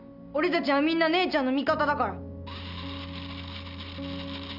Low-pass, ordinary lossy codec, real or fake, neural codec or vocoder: 5.4 kHz; none; real; none